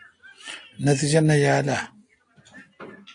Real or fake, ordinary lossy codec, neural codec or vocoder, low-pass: real; AAC, 48 kbps; none; 9.9 kHz